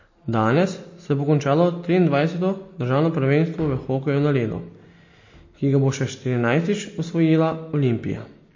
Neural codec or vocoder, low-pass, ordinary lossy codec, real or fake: none; 7.2 kHz; MP3, 32 kbps; real